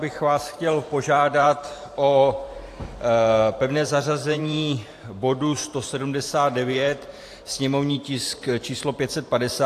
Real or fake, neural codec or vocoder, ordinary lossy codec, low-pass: fake; vocoder, 44.1 kHz, 128 mel bands every 512 samples, BigVGAN v2; AAC, 64 kbps; 14.4 kHz